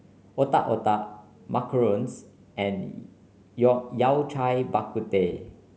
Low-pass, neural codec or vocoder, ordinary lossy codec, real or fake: none; none; none; real